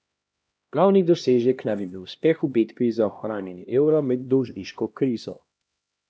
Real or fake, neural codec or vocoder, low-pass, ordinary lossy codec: fake; codec, 16 kHz, 1 kbps, X-Codec, HuBERT features, trained on LibriSpeech; none; none